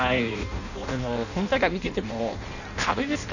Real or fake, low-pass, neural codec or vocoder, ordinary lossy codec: fake; 7.2 kHz; codec, 16 kHz in and 24 kHz out, 0.6 kbps, FireRedTTS-2 codec; none